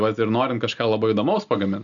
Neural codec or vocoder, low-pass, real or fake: none; 7.2 kHz; real